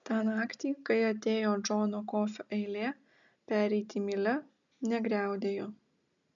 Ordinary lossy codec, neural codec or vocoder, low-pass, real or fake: MP3, 96 kbps; none; 7.2 kHz; real